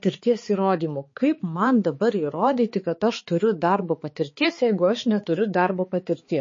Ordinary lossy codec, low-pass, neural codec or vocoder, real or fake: MP3, 32 kbps; 7.2 kHz; codec, 16 kHz, 4 kbps, X-Codec, HuBERT features, trained on balanced general audio; fake